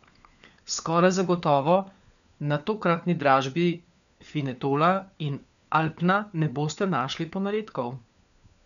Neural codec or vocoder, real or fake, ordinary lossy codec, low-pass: codec, 16 kHz, 4 kbps, FunCodec, trained on LibriTTS, 50 frames a second; fake; none; 7.2 kHz